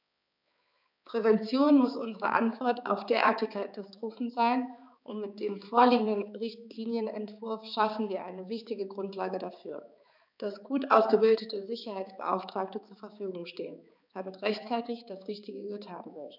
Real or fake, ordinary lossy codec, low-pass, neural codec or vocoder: fake; none; 5.4 kHz; codec, 16 kHz, 4 kbps, X-Codec, HuBERT features, trained on balanced general audio